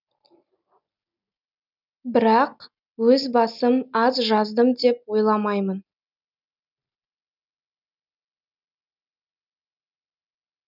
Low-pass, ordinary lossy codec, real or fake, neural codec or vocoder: 5.4 kHz; none; real; none